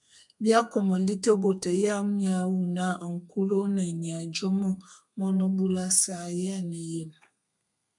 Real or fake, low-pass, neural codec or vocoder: fake; 10.8 kHz; codec, 32 kHz, 1.9 kbps, SNAC